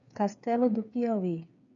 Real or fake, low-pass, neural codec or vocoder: fake; 7.2 kHz; codec, 16 kHz, 8 kbps, FreqCodec, smaller model